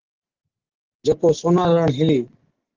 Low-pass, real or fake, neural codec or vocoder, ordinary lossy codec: 7.2 kHz; real; none; Opus, 16 kbps